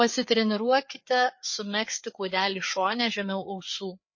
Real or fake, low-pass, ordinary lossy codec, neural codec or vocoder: fake; 7.2 kHz; MP3, 32 kbps; codec, 16 kHz, 4 kbps, FreqCodec, larger model